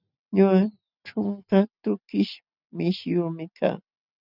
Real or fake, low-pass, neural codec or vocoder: real; 5.4 kHz; none